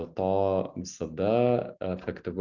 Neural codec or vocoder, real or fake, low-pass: none; real; 7.2 kHz